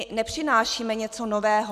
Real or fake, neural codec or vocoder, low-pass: real; none; 14.4 kHz